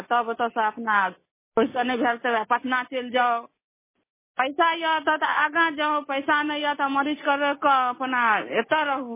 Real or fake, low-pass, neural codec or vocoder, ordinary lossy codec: real; 3.6 kHz; none; MP3, 16 kbps